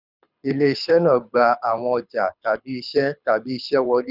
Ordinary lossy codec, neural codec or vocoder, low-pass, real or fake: none; codec, 24 kHz, 6 kbps, HILCodec; 5.4 kHz; fake